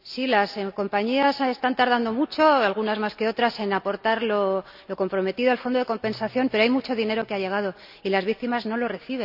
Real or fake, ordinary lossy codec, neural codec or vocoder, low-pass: real; none; none; 5.4 kHz